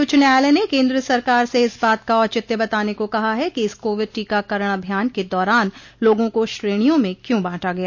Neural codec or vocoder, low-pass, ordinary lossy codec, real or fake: none; none; none; real